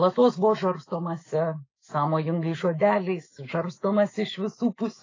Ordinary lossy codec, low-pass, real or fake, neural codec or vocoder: AAC, 32 kbps; 7.2 kHz; fake; autoencoder, 48 kHz, 128 numbers a frame, DAC-VAE, trained on Japanese speech